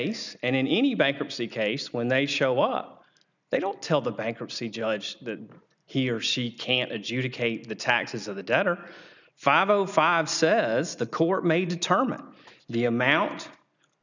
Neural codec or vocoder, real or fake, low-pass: none; real; 7.2 kHz